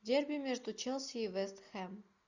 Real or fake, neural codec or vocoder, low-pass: real; none; 7.2 kHz